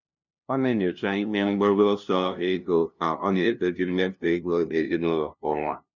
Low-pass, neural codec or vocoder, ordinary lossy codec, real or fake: 7.2 kHz; codec, 16 kHz, 0.5 kbps, FunCodec, trained on LibriTTS, 25 frames a second; none; fake